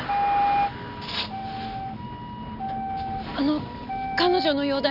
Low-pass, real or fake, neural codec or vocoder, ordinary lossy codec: 5.4 kHz; real; none; none